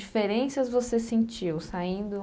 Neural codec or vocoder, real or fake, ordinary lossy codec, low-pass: none; real; none; none